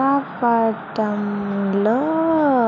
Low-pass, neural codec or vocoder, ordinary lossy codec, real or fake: 7.2 kHz; none; none; real